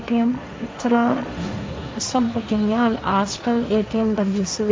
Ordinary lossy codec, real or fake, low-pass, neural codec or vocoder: none; fake; none; codec, 16 kHz, 1.1 kbps, Voila-Tokenizer